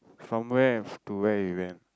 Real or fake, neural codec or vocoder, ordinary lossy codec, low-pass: real; none; none; none